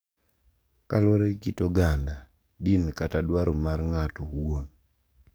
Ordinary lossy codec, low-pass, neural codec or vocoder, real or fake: none; none; codec, 44.1 kHz, 7.8 kbps, DAC; fake